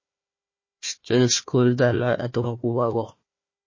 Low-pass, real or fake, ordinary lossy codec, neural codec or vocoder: 7.2 kHz; fake; MP3, 32 kbps; codec, 16 kHz, 1 kbps, FunCodec, trained on Chinese and English, 50 frames a second